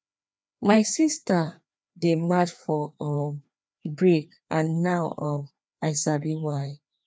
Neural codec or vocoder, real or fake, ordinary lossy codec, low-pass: codec, 16 kHz, 2 kbps, FreqCodec, larger model; fake; none; none